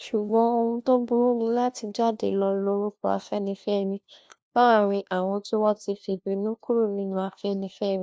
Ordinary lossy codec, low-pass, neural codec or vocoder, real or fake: none; none; codec, 16 kHz, 1 kbps, FunCodec, trained on LibriTTS, 50 frames a second; fake